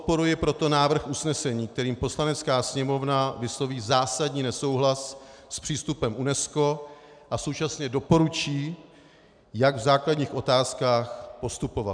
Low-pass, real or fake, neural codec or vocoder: 9.9 kHz; real; none